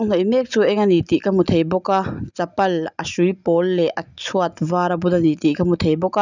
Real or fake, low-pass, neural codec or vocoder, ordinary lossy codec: real; 7.2 kHz; none; none